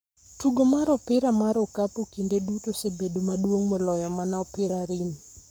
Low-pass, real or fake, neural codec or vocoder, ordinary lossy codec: none; fake; codec, 44.1 kHz, 7.8 kbps, Pupu-Codec; none